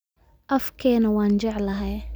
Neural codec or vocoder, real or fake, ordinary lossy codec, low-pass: none; real; none; none